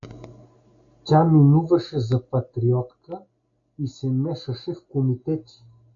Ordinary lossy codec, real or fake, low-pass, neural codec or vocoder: AAC, 64 kbps; real; 7.2 kHz; none